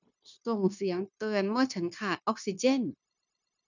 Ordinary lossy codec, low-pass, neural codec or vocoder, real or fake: none; 7.2 kHz; codec, 16 kHz, 0.9 kbps, LongCat-Audio-Codec; fake